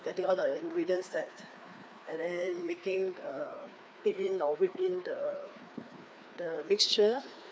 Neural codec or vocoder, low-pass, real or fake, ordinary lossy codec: codec, 16 kHz, 2 kbps, FreqCodec, larger model; none; fake; none